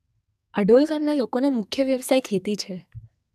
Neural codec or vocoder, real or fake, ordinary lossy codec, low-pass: codec, 44.1 kHz, 2.6 kbps, SNAC; fake; none; 14.4 kHz